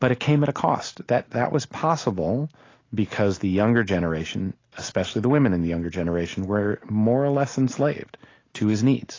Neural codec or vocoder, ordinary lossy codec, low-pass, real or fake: none; AAC, 32 kbps; 7.2 kHz; real